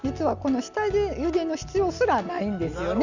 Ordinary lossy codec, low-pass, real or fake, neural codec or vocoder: none; 7.2 kHz; real; none